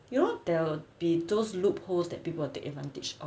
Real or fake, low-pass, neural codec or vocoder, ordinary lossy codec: real; none; none; none